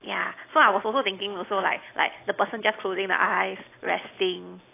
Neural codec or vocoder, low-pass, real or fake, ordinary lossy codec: none; 3.6 kHz; real; AAC, 24 kbps